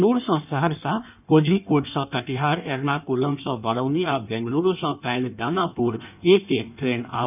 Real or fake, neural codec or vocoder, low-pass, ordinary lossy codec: fake; codec, 16 kHz in and 24 kHz out, 1.1 kbps, FireRedTTS-2 codec; 3.6 kHz; none